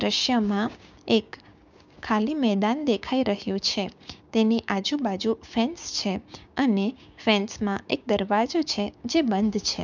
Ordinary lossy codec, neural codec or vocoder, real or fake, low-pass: none; codec, 16 kHz, 6 kbps, DAC; fake; 7.2 kHz